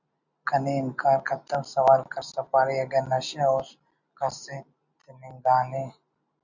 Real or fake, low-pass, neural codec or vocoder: real; 7.2 kHz; none